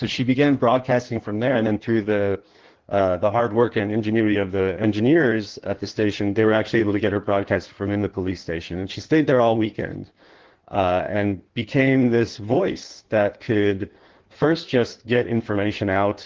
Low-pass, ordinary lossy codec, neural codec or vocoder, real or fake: 7.2 kHz; Opus, 16 kbps; codec, 16 kHz in and 24 kHz out, 1.1 kbps, FireRedTTS-2 codec; fake